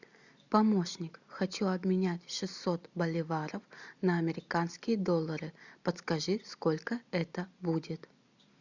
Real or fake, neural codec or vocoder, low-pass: real; none; 7.2 kHz